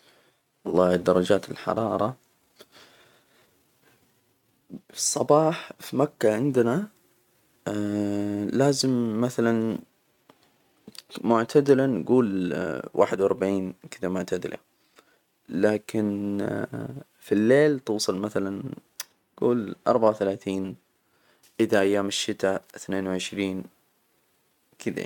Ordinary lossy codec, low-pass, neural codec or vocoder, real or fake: none; 19.8 kHz; none; real